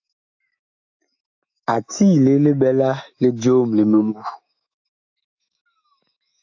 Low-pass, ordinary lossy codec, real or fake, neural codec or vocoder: 7.2 kHz; AAC, 48 kbps; fake; autoencoder, 48 kHz, 128 numbers a frame, DAC-VAE, trained on Japanese speech